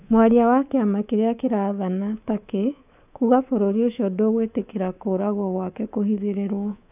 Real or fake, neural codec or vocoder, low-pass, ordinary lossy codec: fake; codec, 24 kHz, 3.1 kbps, DualCodec; 3.6 kHz; AAC, 32 kbps